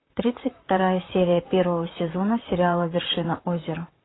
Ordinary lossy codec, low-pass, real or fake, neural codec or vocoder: AAC, 16 kbps; 7.2 kHz; fake; vocoder, 44.1 kHz, 128 mel bands, Pupu-Vocoder